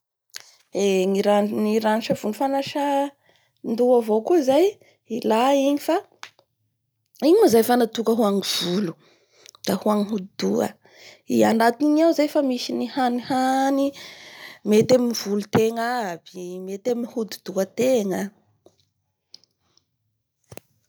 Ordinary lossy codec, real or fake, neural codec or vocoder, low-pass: none; real; none; none